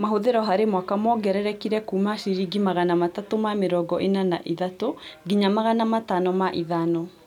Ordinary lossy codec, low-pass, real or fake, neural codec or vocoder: none; 19.8 kHz; real; none